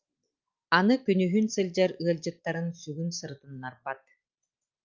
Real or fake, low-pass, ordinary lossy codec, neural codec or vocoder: real; 7.2 kHz; Opus, 24 kbps; none